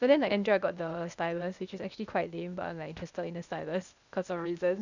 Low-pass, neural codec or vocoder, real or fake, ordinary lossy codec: 7.2 kHz; codec, 16 kHz, 0.8 kbps, ZipCodec; fake; none